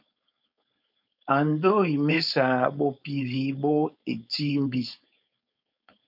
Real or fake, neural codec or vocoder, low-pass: fake; codec, 16 kHz, 4.8 kbps, FACodec; 5.4 kHz